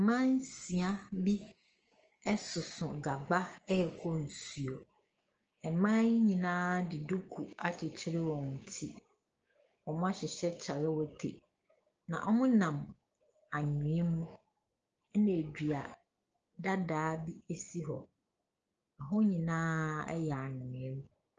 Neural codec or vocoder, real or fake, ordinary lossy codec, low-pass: codec, 16 kHz, 6 kbps, DAC; fake; Opus, 24 kbps; 7.2 kHz